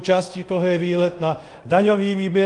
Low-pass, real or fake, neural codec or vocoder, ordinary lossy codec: 10.8 kHz; fake; codec, 24 kHz, 0.5 kbps, DualCodec; Opus, 32 kbps